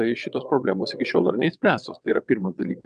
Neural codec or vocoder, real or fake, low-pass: vocoder, 22.05 kHz, 80 mel bands, WaveNeXt; fake; 9.9 kHz